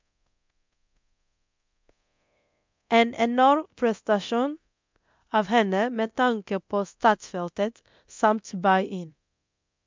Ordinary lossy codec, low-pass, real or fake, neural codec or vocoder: MP3, 64 kbps; 7.2 kHz; fake; codec, 24 kHz, 0.9 kbps, DualCodec